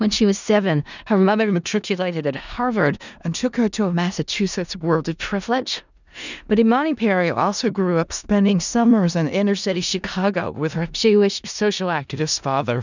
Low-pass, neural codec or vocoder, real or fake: 7.2 kHz; codec, 16 kHz in and 24 kHz out, 0.4 kbps, LongCat-Audio-Codec, four codebook decoder; fake